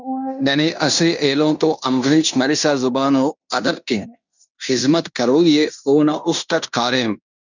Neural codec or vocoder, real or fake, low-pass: codec, 16 kHz in and 24 kHz out, 0.9 kbps, LongCat-Audio-Codec, fine tuned four codebook decoder; fake; 7.2 kHz